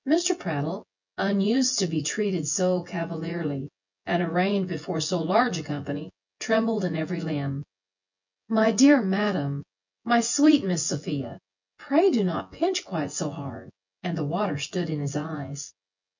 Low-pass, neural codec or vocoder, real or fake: 7.2 kHz; vocoder, 24 kHz, 100 mel bands, Vocos; fake